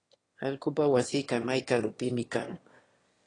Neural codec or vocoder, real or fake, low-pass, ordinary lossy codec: autoencoder, 22.05 kHz, a latent of 192 numbers a frame, VITS, trained on one speaker; fake; 9.9 kHz; AAC, 32 kbps